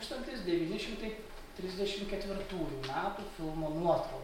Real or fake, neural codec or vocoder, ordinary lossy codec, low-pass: real; none; MP3, 64 kbps; 19.8 kHz